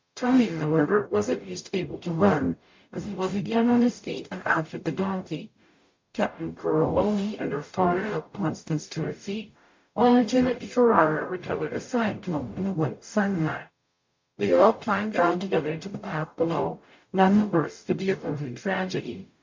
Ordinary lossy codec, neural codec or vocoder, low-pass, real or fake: MP3, 48 kbps; codec, 44.1 kHz, 0.9 kbps, DAC; 7.2 kHz; fake